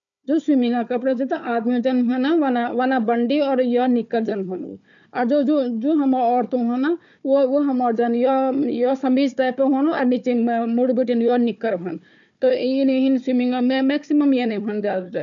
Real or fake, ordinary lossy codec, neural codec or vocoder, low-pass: fake; none; codec, 16 kHz, 4 kbps, FunCodec, trained on Chinese and English, 50 frames a second; 7.2 kHz